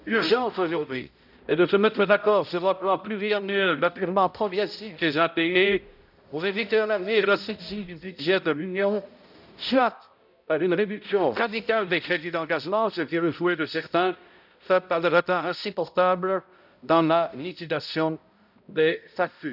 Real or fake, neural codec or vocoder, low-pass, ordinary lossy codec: fake; codec, 16 kHz, 0.5 kbps, X-Codec, HuBERT features, trained on balanced general audio; 5.4 kHz; none